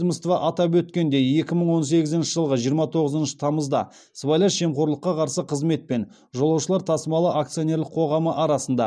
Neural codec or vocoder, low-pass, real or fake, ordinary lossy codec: none; none; real; none